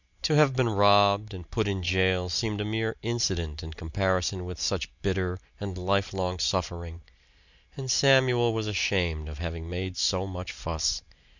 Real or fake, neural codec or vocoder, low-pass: real; none; 7.2 kHz